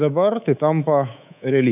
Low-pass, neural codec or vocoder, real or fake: 3.6 kHz; codec, 24 kHz, 3.1 kbps, DualCodec; fake